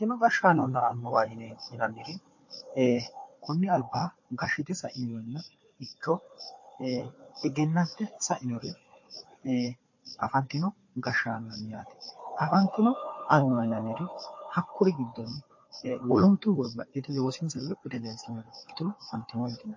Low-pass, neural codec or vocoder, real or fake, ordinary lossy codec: 7.2 kHz; codec, 16 kHz, 4 kbps, FunCodec, trained on Chinese and English, 50 frames a second; fake; MP3, 32 kbps